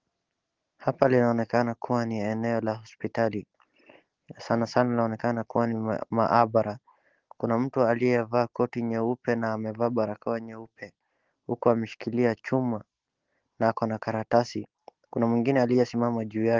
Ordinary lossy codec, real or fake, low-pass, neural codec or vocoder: Opus, 16 kbps; real; 7.2 kHz; none